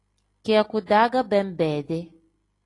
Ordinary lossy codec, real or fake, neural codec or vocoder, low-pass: AAC, 32 kbps; real; none; 10.8 kHz